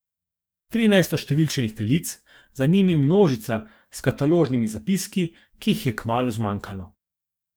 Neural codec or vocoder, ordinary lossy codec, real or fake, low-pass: codec, 44.1 kHz, 2.6 kbps, DAC; none; fake; none